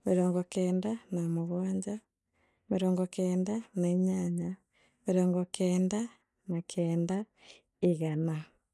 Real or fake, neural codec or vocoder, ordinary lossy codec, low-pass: real; none; none; none